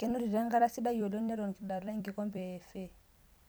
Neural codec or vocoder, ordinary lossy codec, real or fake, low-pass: vocoder, 44.1 kHz, 128 mel bands every 256 samples, BigVGAN v2; none; fake; none